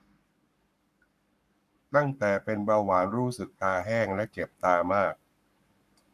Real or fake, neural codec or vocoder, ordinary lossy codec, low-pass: fake; codec, 44.1 kHz, 7.8 kbps, Pupu-Codec; none; 14.4 kHz